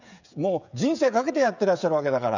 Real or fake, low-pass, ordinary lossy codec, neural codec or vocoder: fake; 7.2 kHz; none; codec, 16 kHz, 16 kbps, FreqCodec, smaller model